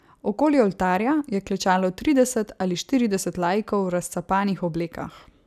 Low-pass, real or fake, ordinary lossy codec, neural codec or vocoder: 14.4 kHz; real; none; none